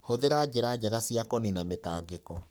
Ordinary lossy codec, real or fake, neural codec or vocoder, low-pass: none; fake; codec, 44.1 kHz, 3.4 kbps, Pupu-Codec; none